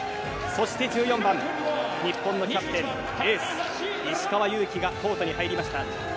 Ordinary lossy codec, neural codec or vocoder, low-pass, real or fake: none; none; none; real